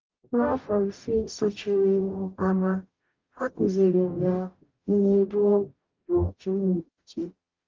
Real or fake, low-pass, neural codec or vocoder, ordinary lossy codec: fake; 7.2 kHz; codec, 44.1 kHz, 0.9 kbps, DAC; Opus, 32 kbps